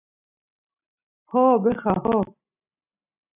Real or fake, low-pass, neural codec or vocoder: real; 3.6 kHz; none